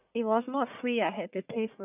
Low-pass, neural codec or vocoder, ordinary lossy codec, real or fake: 3.6 kHz; codec, 44.1 kHz, 1.7 kbps, Pupu-Codec; none; fake